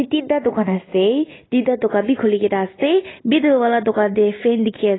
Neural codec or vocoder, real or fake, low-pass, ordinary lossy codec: none; real; 7.2 kHz; AAC, 16 kbps